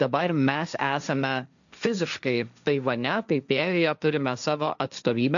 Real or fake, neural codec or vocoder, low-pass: fake; codec, 16 kHz, 1.1 kbps, Voila-Tokenizer; 7.2 kHz